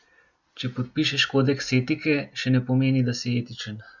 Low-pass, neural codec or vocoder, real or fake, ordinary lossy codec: 7.2 kHz; none; real; none